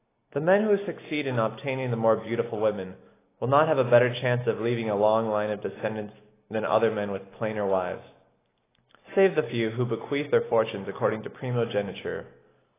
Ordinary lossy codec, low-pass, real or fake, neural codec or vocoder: AAC, 16 kbps; 3.6 kHz; real; none